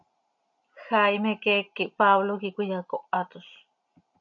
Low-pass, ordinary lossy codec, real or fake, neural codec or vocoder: 7.2 kHz; MP3, 48 kbps; real; none